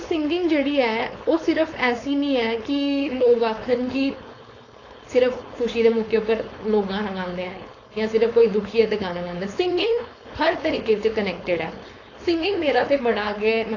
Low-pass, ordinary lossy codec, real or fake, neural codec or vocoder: 7.2 kHz; AAC, 32 kbps; fake; codec, 16 kHz, 4.8 kbps, FACodec